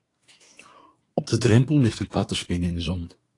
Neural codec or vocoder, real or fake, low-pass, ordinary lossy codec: codec, 24 kHz, 1 kbps, SNAC; fake; 10.8 kHz; AAC, 48 kbps